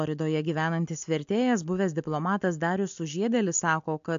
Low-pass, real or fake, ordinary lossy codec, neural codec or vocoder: 7.2 kHz; real; AAC, 64 kbps; none